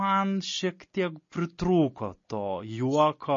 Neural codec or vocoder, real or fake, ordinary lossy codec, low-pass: none; real; MP3, 32 kbps; 7.2 kHz